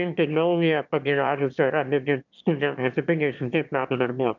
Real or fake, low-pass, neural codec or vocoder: fake; 7.2 kHz; autoencoder, 22.05 kHz, a latent of 192 numbers a frame, VITS, trained on one speaker